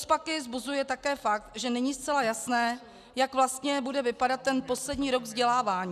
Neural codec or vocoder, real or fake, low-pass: none; real; 14.4 kHz